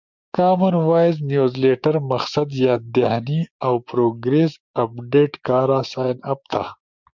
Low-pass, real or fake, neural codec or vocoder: 7.2 kHz; fake; codec, 16 kHz, 6 kbps, DAC